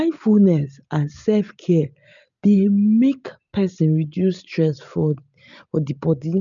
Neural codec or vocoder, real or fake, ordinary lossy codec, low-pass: none; real; none; 7.2 kHz